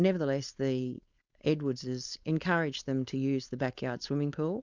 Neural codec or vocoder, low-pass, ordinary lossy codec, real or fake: codec, 16 kHz, 4.8 kbps, FACodec; 7.2 kHz; Opus, 64 kbps; fake